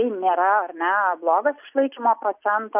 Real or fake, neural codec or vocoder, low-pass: real; none; 3.6 kHz